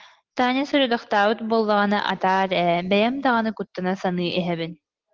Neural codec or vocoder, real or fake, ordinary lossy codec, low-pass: none; real; Opus, 16 kbps; 7.2 kHz